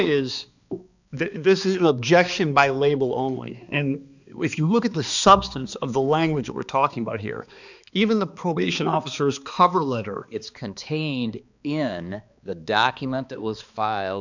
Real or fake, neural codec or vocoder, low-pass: fake; codec, 16 kHz, 2 kbps, X-Codec, HuBERT features, trained on balanced general audio; 7.2 kHz